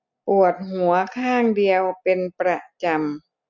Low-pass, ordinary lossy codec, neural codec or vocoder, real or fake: 7.2 kHz; none; none; real